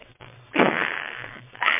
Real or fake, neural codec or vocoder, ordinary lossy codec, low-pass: fake; vocoder, 22.05 kHz, 80 mel bands, WaveNeXt; MP3, 24 kbps; 3.6 kHz